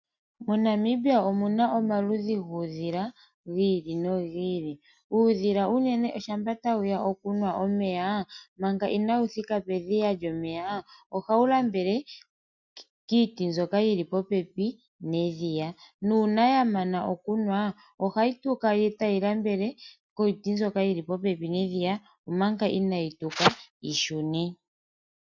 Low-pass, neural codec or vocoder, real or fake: 7.2 kHz; none; real